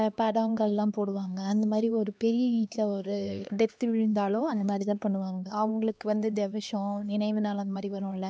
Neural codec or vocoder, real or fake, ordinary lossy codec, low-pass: codec, 16 kHz, 2 kbps, X-Codec, HuBERT features, trained on LibriSpeech; fake; none; none